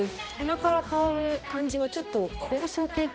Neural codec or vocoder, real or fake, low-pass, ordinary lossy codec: codec, 16 kHz, 1 kbps, X-Codec, HuBERT features, trained on balanced general audio; fake; none; none